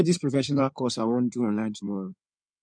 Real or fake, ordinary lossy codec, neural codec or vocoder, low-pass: fake; none; codec, 16 kHz in and 24 kHz out, 2.2 kbps, FireRedTTS-2 codec; 9.9 kHz